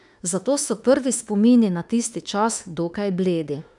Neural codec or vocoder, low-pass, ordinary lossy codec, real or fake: autoencoder, 48 kHz, 32 numbers a frame, DAC-VAE, trained on Japanese speech; 10.8 kHz; none; fake